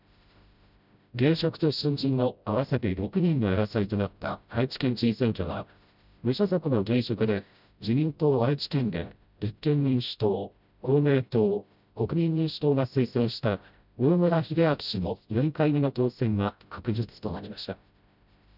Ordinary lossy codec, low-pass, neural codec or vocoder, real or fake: none; 5.4 kHz; codec, 16 kHz, 0.5 kbps, FreqCodec, smaller model; fake